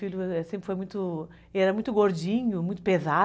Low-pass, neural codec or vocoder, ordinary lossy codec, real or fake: none; none; none; real